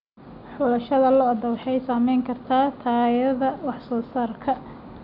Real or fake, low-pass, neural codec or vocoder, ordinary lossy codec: real; 5.4 kHz; none; none